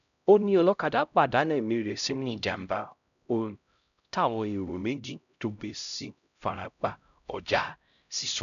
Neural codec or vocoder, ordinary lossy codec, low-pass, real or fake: codec, 16 kHz, 0.5 kbps, X-Codec, HuBERT features, trained on LibriSpeech; none; 7.2 kHz; fake